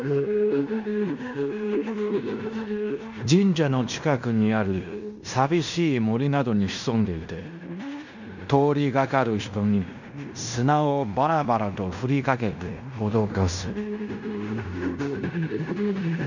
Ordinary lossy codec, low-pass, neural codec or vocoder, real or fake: none; 7.2 kHz; codec, 16 kHz in and 24 kHz out, 0.9 kbps, LongCat-Audio-Codec, fine tuned four codebook decoder; fake